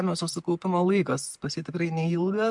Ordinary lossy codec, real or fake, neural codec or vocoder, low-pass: MP3, 64 kbps; real; none; 10.8 kHz